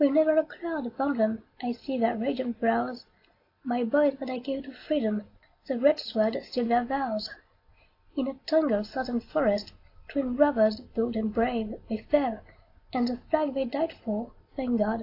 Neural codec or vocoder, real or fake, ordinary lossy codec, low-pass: none; real; AAC, 32 kbps; 5.4 kHz